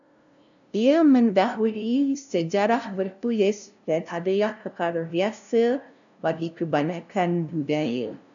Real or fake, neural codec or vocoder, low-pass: fake; codec, 16 kHz, 0.5 kbps, FunCodec, trained on LibriTTS, 25 frames a second; 7.2 kHz